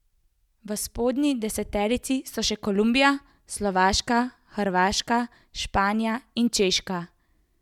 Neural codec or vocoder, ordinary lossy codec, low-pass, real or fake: none; none; 19.8 kHz; real